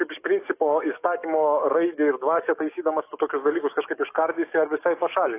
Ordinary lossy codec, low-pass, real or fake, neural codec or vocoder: AAC, 24 kbps; 3.6 kHz; fake; codec, 44.1 kHz, 7.8 kbps, DAC